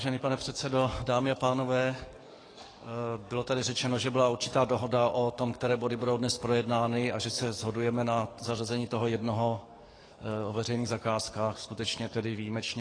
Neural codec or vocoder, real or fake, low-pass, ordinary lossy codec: codec, 44.1 kHz, 7.8 kbps, DAC; fake; 9.9 kHz; AAC, 32 kbps